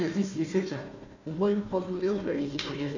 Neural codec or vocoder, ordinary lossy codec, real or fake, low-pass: codec, 16 kHz, 1 kbps, FunCodec, trained on Chinese and English, 50 frames a second; none; fake; 7.2 kHz